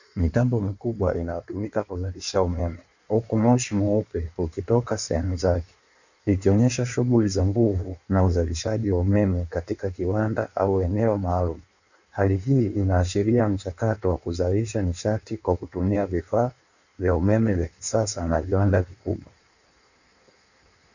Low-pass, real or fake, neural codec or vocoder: 7.2 kHz; fake; codec, 16 kHz in and 24 kHz out, 1.1 kbps, FireRedTTS-2 codec